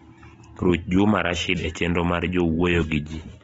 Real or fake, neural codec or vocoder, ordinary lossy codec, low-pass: real; none; AAC, 24 kbps; 19.8 kHz